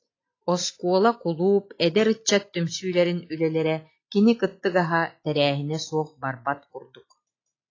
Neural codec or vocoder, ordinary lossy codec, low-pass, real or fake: none; AAC, 32 kbps; 7.2 kHz; real